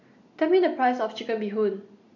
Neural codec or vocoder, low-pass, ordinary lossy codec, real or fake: none; 7.2 kHz; none; real